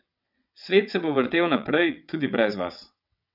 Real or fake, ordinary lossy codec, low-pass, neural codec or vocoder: fake; none; 5.4 kHz; vocoder, 22.05 kHz, 80 mel bands, WaveNeXt